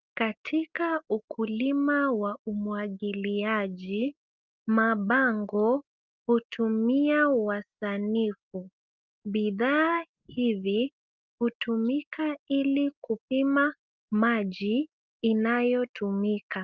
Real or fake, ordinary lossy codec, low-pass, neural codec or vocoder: real; Opus, 32 kbps; 7.2 kHz; none